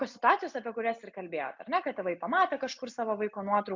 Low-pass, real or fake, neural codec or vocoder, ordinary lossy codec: 7.2 kHz; real; none; MP3, 64 kbps